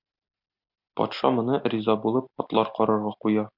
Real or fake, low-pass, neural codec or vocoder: real; 5.4 kHz; none